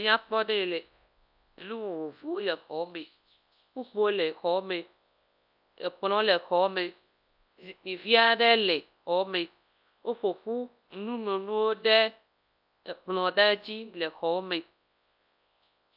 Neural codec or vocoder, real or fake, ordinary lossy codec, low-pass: codec, 24 kHz, 0.9 kbps, WavTokenizer, large speech release; fake; AAC, 48 kbps; 5.4 kHz